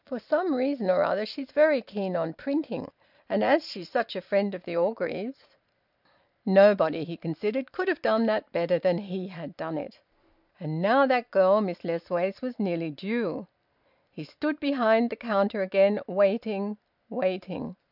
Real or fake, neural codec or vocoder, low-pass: real; none; 5.4 kHz